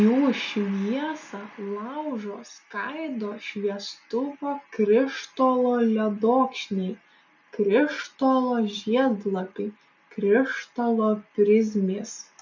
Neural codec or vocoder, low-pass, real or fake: none; 7.2 kHz; real